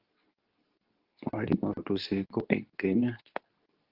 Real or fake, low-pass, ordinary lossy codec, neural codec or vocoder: fake; 5.4 kHz; Opus, 32 kbps; codec, 24 kHz, 0.9 kbps, WavTokenizer, medium speech release version 2